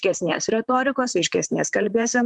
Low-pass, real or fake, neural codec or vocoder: 10.8 kHz; fake; vocoder, 44.1 kHz, 128 mel bands, Pupu-Vocoder